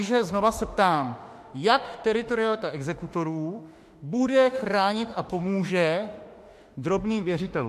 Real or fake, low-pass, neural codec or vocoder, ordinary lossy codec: fake; 14.4 kHz; autoencoder, 48 kHz, 32 numbers a frame, DAC-VAE, trained on Japanese speech; MP3, 64 kbps